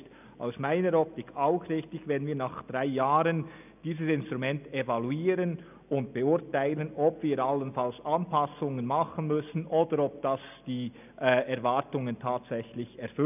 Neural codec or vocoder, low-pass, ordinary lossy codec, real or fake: none; 3.6 kHz; none; real